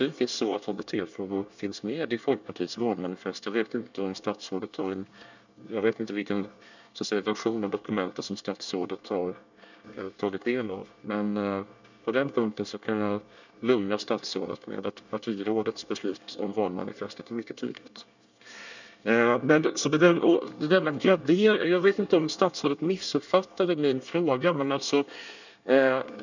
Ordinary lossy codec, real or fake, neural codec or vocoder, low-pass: none; fake; codec, 24 kHz, 1 kbps, SNAC; 7.2 kHz